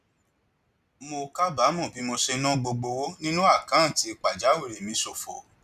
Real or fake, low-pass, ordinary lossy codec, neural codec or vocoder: real; 14.4 kHz; none; none